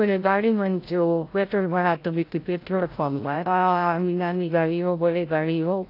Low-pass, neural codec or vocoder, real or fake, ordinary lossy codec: 5.4 kHz; codec, 16 kHz, 0.5 kbps, FreqCodec, larger model; fake; AAC, 32 kbps